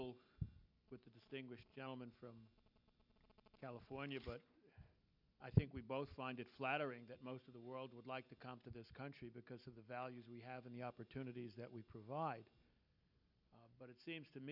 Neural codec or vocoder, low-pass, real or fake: none; 5.4 kHz; real